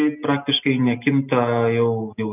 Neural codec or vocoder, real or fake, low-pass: none; real; 3.6 kHz